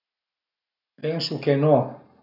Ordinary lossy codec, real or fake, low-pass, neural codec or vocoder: none; real; 5.4 kHz; none